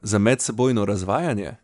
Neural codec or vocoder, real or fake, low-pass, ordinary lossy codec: none; real; 10.8 kHz; none